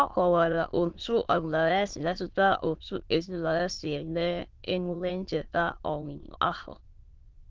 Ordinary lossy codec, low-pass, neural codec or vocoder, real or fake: Opus, 16 kbps; 7.2 kHz; autoencoder, 22.05 kHz, a latent of 192 numbers a frame, VITS, trained on many speakers; fake